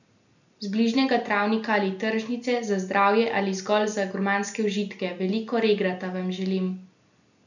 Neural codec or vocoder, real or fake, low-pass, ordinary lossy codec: none; real; 7.2 kHz; none